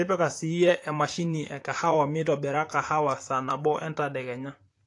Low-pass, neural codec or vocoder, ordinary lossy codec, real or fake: 10.8 kHz; vocoder, 44.1 kHz, 128 mel bands, Pupu-Vocoder; AAC, 48 kbps; fake